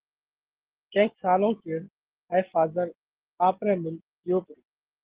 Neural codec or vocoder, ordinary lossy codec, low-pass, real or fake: none; Opus, 16 kbps; 3.6 kHz; real